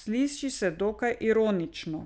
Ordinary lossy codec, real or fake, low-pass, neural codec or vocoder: none; real; none; none